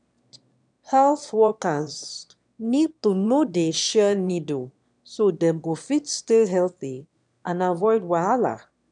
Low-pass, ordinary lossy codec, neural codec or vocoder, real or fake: 9.9 kHz; none; autoencoder, 22.05 kHz, a latent of 192 numbers a frame, VITS, trained on one speaker; fake